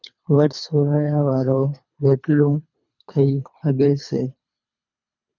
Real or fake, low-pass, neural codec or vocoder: fake; 7.2 kHz; codec, 24 kHz, 3 kbps, HILCodec